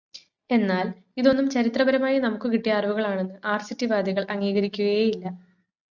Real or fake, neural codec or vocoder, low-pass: real; none; 7.2 kHz